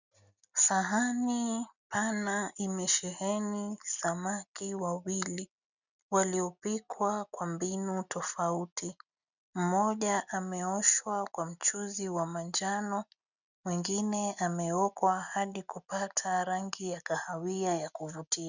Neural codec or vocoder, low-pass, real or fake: none; 7.2 kHz; real